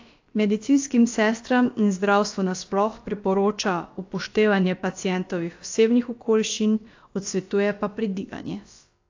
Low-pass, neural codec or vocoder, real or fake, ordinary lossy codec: 7.2 kHz; codec, 16 kHz, about 1 kbps, DyCAST, with the encoder's durations; fake; AAC, 48 kbps